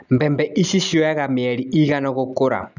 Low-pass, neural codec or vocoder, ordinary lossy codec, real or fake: 7.2 kHz; none; none; real